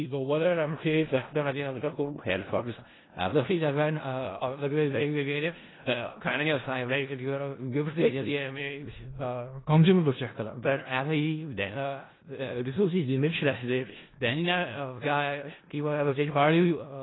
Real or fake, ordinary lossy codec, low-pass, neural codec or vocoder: fake; AAC, 16 kbps; 7.2 kHz; codec, 16 kHz in and 24 kHz out, 0.4 kbps, LongCat-Audio-Codec, four codebook decoder